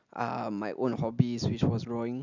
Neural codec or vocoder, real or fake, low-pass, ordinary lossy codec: none; real; 7.2 kHz; none